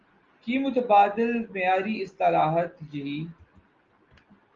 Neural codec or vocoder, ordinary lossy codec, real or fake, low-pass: none; Opus, 32 kbps; real; 7.2 kHz